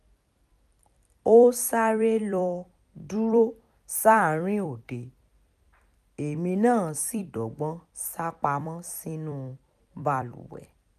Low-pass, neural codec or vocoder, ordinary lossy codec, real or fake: 14.4 kHz; vocoder, 44.1 kHz, 128 mel bands every 256 samples, BigVGAN v2; none; fake